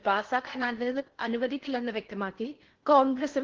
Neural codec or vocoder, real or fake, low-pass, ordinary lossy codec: codec, 16 kHz in and 24 kHz out, 0.6 kbps, FocalCodec, streaming, 4096 codes; fake; 7.2 kHz; Opus, 16 kbps